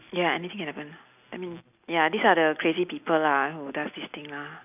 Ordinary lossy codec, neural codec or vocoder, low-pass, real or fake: none; none; 3.6 kHz; real